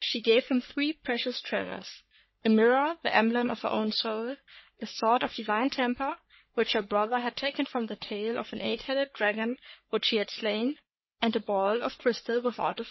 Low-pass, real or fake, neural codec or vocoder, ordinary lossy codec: 7.2 kHz; fake; codec, 44.1 kHz, 3.4 kbps, Pupu-Codec; MP3, 24 kbps